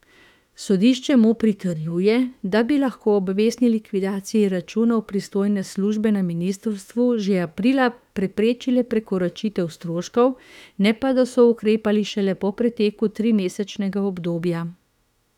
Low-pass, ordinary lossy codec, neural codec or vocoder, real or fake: 19.8 kHz; none; autoencoder, 48 kHz, 32 numbers a frame, DAC-VAE, trained on Japanese speech; fake